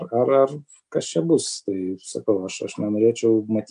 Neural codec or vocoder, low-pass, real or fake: none; 9.9 kHz; real